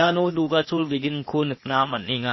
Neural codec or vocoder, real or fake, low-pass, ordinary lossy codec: codec, 16 kHz, 0.8 kbps, ZipCodec; fake; 7.2 kHz; MP3, 24 kbps